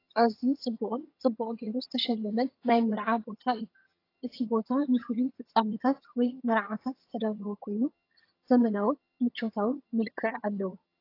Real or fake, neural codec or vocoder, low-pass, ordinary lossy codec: fake; vocoder, 22.05 kHz, 80 mel bands, HiFi-GAN; 5.4 kHz; AAC, 32 kbps